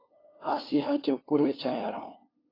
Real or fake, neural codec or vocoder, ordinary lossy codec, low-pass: fake; codec, 16 kHz, 2 kbps, FunCodec, trained on LibriTTS, 25 frames a second; AAC, 24 kbps; 5.4 kHz